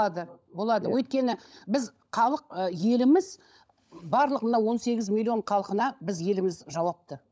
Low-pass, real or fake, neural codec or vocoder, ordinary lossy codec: none; fake; codec, 16 kHz, 16 kbps, FunCodec, trained on LibriTTS, 50 frames a second; none